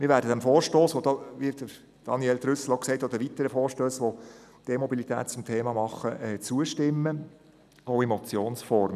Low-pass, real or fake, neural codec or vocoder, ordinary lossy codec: 14.4 kHz; real; none; none